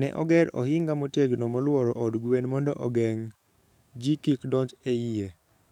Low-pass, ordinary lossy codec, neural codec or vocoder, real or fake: 19.8 kHz; none; codec, 44.1 kHz, 7.8 kbps, DAC; fake